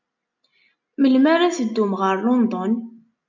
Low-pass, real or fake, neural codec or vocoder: 7.2 kHz; real; none